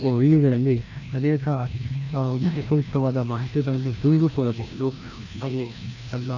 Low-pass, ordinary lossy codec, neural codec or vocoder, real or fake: 7.2 kHz; none; codec, 16 kHz, 1 kbps, FreqCodec, larger model; fake